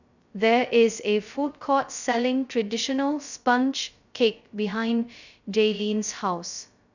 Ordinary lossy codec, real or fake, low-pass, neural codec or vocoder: none; fake; 7.2 kHz; codec, 16 kHz, 0.2 kbps, FocalCodec